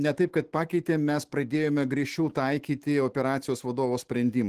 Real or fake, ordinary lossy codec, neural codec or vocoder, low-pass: fake; Opus, 16 kbps; autoencoder, 48 kHz, 128 numbers a frame, DAC-VAE, trained on Japanese speech; 14.4 kHz